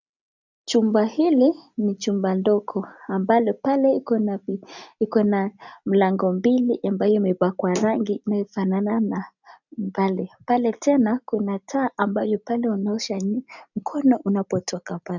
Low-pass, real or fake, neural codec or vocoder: 7.2 kHz; real; none